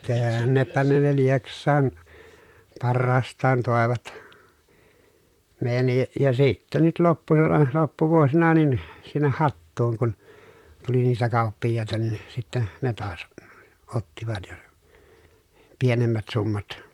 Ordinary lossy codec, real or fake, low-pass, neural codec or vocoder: MP3, 96 kbps; fake; 19.8 kHz; vocoder, 44.1 kHz, 128 mel bands, Pupu-Vocoder